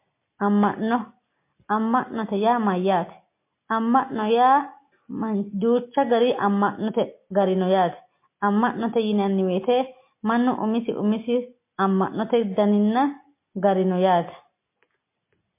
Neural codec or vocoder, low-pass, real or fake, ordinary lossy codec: none; 3.6 kHz; real; MP3, 24 kbps